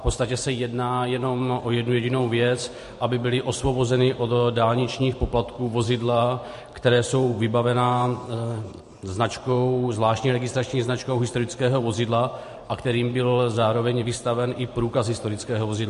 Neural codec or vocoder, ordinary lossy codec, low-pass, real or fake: vocoder, 44.1 kHz, 128 mel bands every 512 samples, BigVGAN v2; MP3, 48 kbps; 14.4 kHz; fake